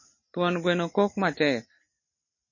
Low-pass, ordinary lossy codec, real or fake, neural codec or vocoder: 7.2 kHz; MP3, 32 kbps; real; none